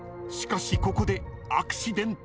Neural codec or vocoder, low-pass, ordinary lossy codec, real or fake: none; none; none; real